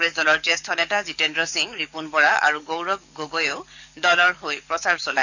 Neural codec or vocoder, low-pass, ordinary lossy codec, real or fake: codec, 44.1 kHz, 7.8 kbps, DAC; 7.2 kHz; none; fake